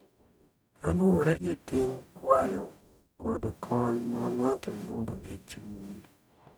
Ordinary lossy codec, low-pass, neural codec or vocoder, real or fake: none; none; codec, 44.1 kHz, 0.9 kbps, DAC; fake